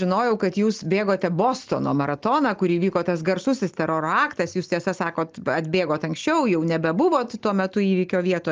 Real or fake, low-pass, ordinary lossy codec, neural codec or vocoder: real; 7.2 kHz; Opus, 24 kbps; none